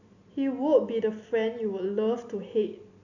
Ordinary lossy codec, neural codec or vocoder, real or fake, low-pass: none; none; real; 7.2 kHz